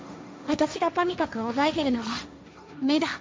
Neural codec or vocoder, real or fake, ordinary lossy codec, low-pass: codec, 16 kHz, 1.1 kbps, Voila-Tokenizer; fake; none; none